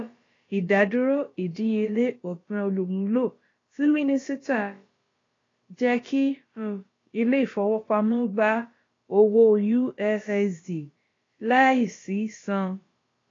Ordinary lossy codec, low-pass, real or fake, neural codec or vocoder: AAC, 32 kbps; 7.2 kHz; fake; codec, 16 kHz, about 1 kbps, DyCAST, with the encoder's durations